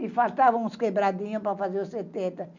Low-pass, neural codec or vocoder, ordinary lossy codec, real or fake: 7.2 kHz; none; none; real